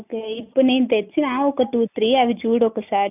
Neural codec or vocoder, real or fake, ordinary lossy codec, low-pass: none; real; none; 3.6 kHz